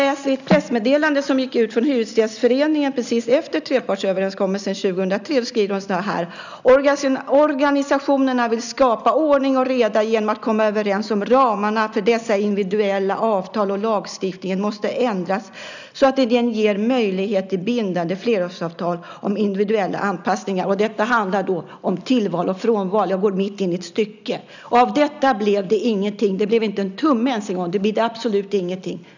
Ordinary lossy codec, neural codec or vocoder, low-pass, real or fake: none; none; 7.2 kHz; real